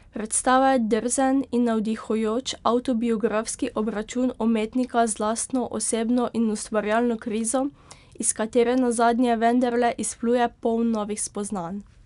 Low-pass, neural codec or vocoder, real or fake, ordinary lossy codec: 10.8 kHz; none; real; none